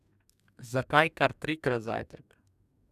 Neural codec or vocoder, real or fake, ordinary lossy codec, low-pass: codec, 44.1 kHz, 2.6 kbps, DAC; fake; none; 14.4 kHz